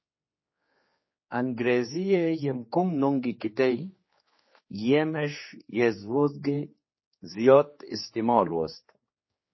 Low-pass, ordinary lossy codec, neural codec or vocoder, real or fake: 7.2 kHz; MP3, 24 kbps; codec, 16 kHz, 4 kbps, X-Codec, HuBERT features, trained on general audio; fake